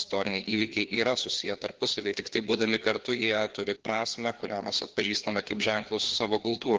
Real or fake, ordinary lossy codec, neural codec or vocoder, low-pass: fake; Opus, 16 kbps; codec, 16 kHz, 2 kbps, FreqCodec, larger model; 7.2 kHz